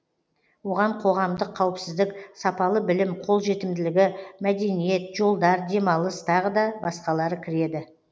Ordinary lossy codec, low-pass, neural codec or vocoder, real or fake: none; none; none; real